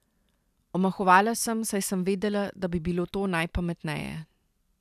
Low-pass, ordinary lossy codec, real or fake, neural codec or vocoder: 14.4 kHz; none; fake; vocoder, 44.1 kHz, 128 mel bands every 256 samples, BigVGAN v2